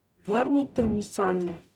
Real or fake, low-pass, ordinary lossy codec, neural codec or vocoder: fake; 19.8 kHz; none; codec, 44.1 kHz, 0.9 kbps, DAC